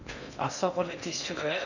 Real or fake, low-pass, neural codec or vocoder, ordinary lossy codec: fake; 7.2 kHz; codec, 16 kHz in and 24 kHz out, 0.6 kbps, FocalCodec, streaming, 2048 codes; none